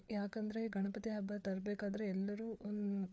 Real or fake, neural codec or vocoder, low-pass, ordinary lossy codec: fake; codec, 16 kHz, 4 kbps, FreqCodec, larger model; none; none